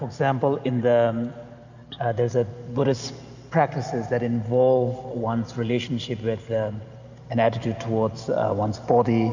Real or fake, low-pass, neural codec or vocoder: real; 7.2 kHz; none